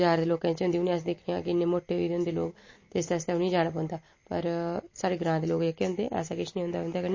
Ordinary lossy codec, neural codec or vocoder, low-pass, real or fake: MP3, 32 kbps; none; 7.2 kHz; real